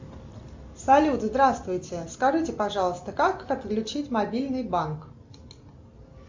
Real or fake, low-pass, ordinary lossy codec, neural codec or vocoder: real; 7.2 kHz; MP3, 64 kbps; none